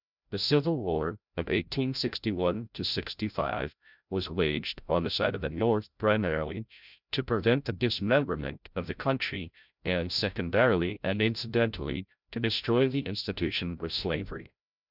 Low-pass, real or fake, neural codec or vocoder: 5.4 kHz; fake; codec, 16 kHz, 0.5 kbps, FreqCodec, larger model